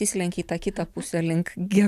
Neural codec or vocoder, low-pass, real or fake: none; 14.4 kHz; real